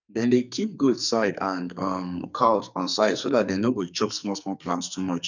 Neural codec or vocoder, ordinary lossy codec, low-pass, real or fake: codec, 44.1 kHz, 2.6 kbps, SNAC; none; 7.2 kHz; fake